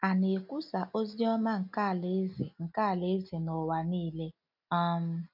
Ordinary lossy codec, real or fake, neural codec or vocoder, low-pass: none; real; none; 5.4 kHz